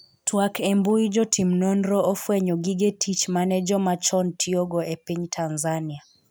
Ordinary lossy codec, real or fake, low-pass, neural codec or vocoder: none; real; none; none